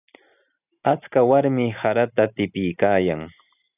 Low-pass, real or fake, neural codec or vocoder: 3.6 kHz; real; none